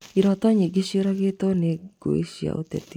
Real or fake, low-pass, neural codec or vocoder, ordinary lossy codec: real; 19.8 kHz; none; none